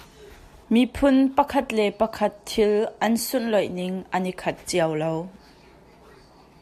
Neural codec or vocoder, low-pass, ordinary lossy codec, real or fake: none; 14.4 kHz; AAC, 96 kbps; real